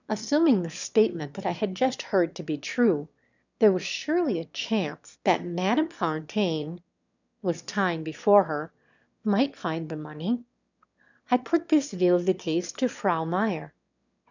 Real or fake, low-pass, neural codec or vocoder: fake; 7.2 kHz; autoencoder, 22.05 kHz, a latent of 192 numbers a frame, VITS, trained on one speaker